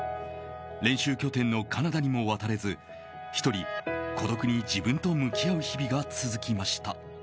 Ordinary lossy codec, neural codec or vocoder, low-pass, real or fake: none; none; none; real